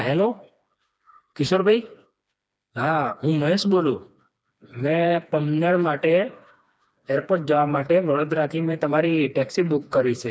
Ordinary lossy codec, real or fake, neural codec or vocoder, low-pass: none; fake; codec, 16 kHz, 2 kbps, FreqCodec, smaller model; none